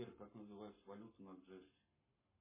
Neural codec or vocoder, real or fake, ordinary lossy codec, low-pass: codec, 44.1 kHz, 7.8 kbps, Pupu-Codec; fake; MP3, 16 kbps; 3.6 kHz